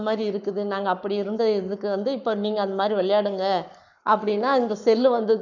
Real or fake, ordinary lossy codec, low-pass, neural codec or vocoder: fake; none; 7.2 kHz; codec, 44.1 kHz, 7.8 kbps, Pupu-Codec